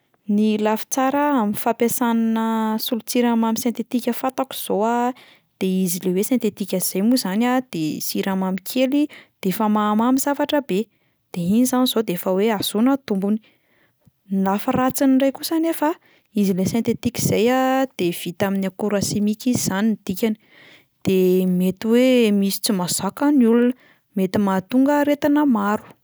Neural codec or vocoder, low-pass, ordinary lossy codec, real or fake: none; none; none; real